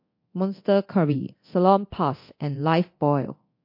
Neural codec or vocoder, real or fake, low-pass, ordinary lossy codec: codec, 24 kHz, 0.9 kbps, DualCodec; fake; 5.4 kHz; MP3, 32 kbps